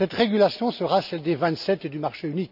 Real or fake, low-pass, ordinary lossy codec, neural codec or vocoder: real; 5.4 kHz; none; none